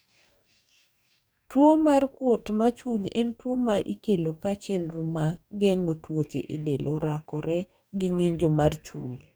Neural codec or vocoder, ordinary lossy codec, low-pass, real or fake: codec, 44.1 kHz, 2.6 kbps, DAC; none; none; fake